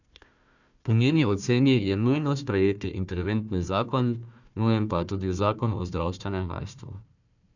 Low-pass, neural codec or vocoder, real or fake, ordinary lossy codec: 7.2 kHz; codec, 16 kHz, 1 kbps, FunCodec, trained on Chinese and English, 50 frames a second; fake; none